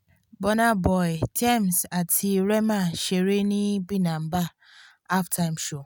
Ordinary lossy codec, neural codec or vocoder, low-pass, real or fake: none; none; none; real